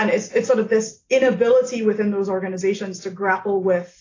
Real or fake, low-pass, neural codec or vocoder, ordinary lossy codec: real; 7.2 kHz; none; AAC, 32 kbps